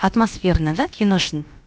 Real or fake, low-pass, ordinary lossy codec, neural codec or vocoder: fake; none; none; codec, 16 kHz, about 1 kbps, DyCAST, with the encoder's durations